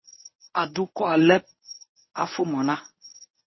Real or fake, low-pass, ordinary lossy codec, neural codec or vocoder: fake; 7.2 kHz; MP3, 24 kbps; codec, 24 kHz, 0.9 kbps, WavTokenizer, medium speech release version 1